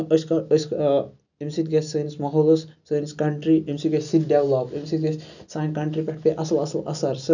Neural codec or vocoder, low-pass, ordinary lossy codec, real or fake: none; 7.2 kHz; none; real